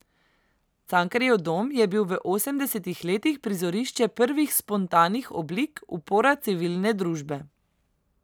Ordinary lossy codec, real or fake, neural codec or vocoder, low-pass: none; real; none; none